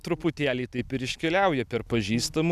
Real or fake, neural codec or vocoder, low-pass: real; none; 14.4 kHz